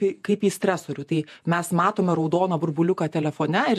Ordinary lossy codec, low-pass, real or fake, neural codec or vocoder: MP3, 64 kbps; 14.4 kHz; fake; vocoder, 48 kHz, 128 mel bands, Vocos